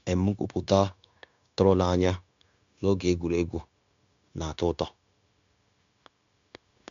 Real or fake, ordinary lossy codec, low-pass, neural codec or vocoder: fake; MP3, 64 kbps; 7.2 kHz; codec, 16 kHz, 0.9 kbps, LongCat-Audio-Codec